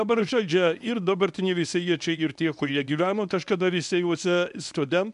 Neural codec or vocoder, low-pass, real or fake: codec, 24 kHz, 0.9 kbps, WavTokenizer, medium speech release version 1; 10.8 kHz; fake